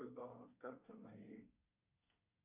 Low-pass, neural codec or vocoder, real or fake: 3.6 kHz; codec, 24 kHz, 1 kbps, SNAC; fake